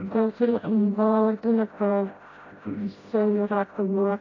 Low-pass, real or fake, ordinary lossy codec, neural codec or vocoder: 7.2 kHz; fake; AAC, 32 kbps; codec, 16 kHz, 0.5 kbps, FreqCodec, smaller model